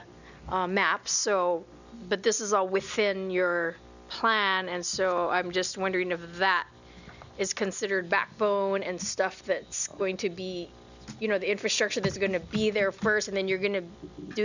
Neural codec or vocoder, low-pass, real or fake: none; 7.2 kHz; real